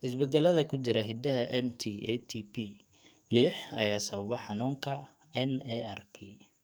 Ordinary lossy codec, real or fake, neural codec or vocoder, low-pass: none; fake; codec, 44.1 kHz, 2.6 kbps, SNAC; none